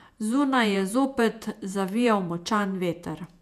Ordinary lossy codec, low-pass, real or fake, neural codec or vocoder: none; 14.4 kHz; fake; vocoder, 48 kHz, 128 mel bands, Vocos